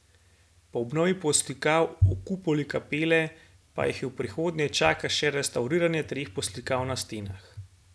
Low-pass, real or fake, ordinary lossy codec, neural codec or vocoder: none; real; none; none